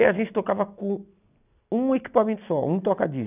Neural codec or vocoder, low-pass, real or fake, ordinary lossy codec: none; 3.6 kHz; real; none